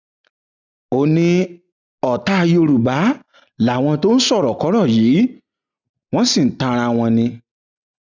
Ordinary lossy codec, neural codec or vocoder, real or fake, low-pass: none; none; real; 7.2 kHz